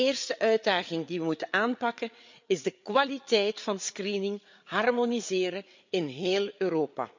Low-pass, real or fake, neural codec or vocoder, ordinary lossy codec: 7.2 kHz; fake; codec, 16 kHz, 8 kbps, FreqCodec, larger model; MP3, 64 kbps